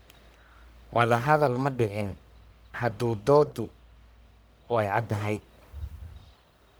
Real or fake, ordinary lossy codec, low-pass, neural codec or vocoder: fake; none; none; codec, 44.1 kHz, 1.7 kbps, Pupu-Codec